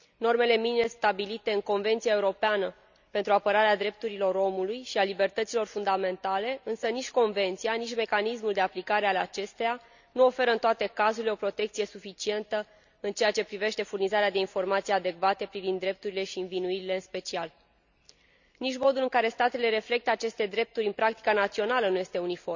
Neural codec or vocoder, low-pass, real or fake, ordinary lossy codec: none; 7.2 kHz; real; none